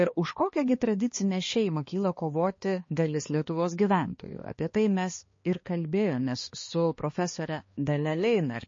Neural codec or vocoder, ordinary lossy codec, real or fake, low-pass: codec, 16 kHz, 2 kbps, X-Codec, HuBERT features, trained on balanced general audio; MP3, 32 kbps; fake; 7.2 kHz